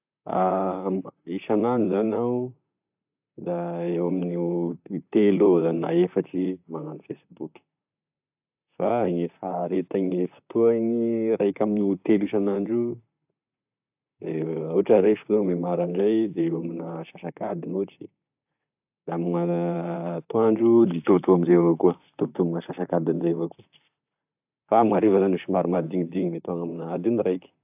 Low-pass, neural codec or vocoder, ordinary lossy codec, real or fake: 3.6 kHz; vocoder, 44.1 kHz, 128 mel bands, Pupu-Vocoder; AAC, 32 kbps; fake